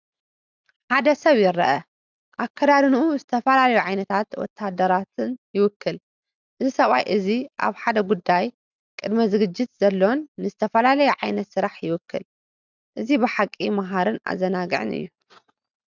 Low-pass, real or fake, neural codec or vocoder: 7.2 kHz; real; none